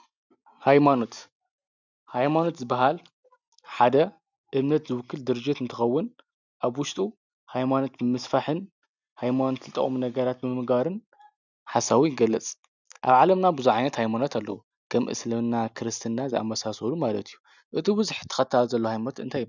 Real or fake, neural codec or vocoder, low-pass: real; none; 7.2 kHz